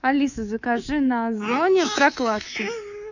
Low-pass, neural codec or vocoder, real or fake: 7.2 kHz; codec, 24 kHz, 3.1 kbps, DualCodec; fake